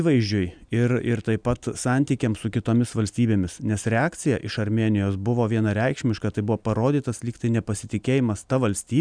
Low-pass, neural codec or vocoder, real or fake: 9.9 kHz; none; real